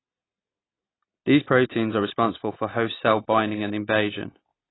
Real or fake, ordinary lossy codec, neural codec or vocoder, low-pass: real; AAC, 16 kbps; none; 7.2 kHz